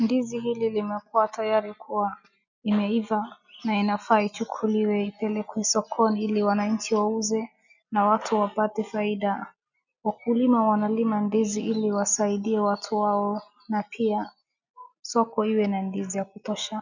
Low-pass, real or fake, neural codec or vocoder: 7.2 kHz; real; none